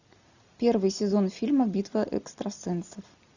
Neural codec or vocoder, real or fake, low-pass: none; real; 7.2 kHz